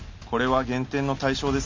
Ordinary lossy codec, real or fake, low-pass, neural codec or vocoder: MP3, 48 kbps; real; 7.2 kHz; none